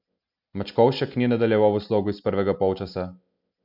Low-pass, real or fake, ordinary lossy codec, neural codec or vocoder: 5.4 kHz; real; none; none